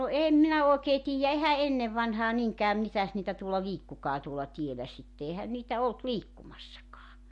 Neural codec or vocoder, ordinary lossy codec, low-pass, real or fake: none; MP3, 48 kbps; 10.8 kHz; real